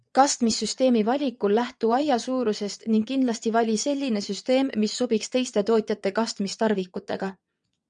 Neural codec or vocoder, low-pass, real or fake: vocoder, 22.05 kHz, 80 mel bands, WaveNeXt; 9.9 kHz; fake